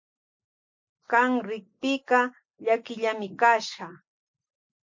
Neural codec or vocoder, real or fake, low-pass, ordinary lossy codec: none; real; 7.2 kHz; MP3, 48 kbps